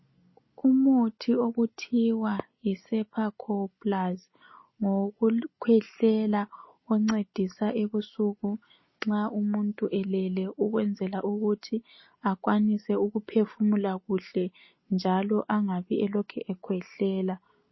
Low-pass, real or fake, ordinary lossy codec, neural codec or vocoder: 7.2 kHz; real; MP3, 24 kbps; none